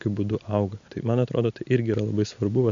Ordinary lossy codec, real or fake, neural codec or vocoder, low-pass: MP3, 64 kbps; real; none; 7.2 kHz